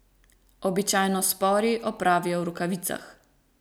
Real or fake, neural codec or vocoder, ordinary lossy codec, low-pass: real; none; none; none